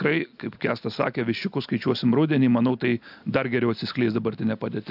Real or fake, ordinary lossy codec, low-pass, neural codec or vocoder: real; AAC, 48 kbps; 5.4 kHz; none